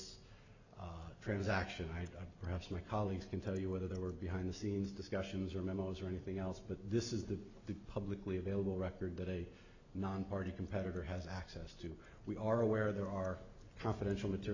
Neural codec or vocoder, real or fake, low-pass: none; real; 7.2 kHz